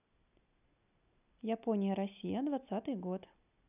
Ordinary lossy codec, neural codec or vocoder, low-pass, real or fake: none; none; 3.6 kHz; real